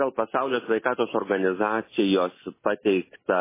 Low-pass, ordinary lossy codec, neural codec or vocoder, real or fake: 3.6 kHz; MP3, 16 kbps; none; real